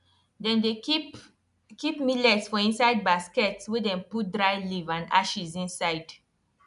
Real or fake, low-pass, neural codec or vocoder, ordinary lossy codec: real; 10.8 kHz; none; none